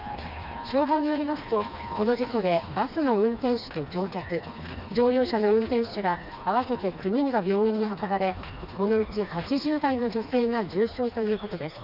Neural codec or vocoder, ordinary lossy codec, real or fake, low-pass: codec, 16 kHz, 2 kbps, FreqCodec, smaller model; none; fake; 5.4 kHz